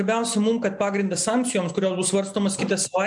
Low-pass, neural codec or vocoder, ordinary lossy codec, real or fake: 10.8 kHz; none; MP3, 64 kbps; real